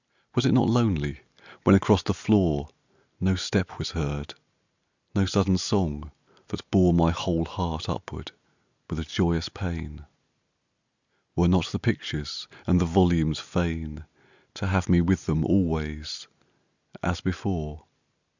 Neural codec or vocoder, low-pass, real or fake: none; 7.2 kHz; real